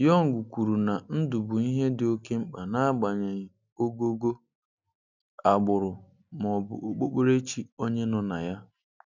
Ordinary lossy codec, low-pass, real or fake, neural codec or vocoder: none; 7.2 kHz; real; none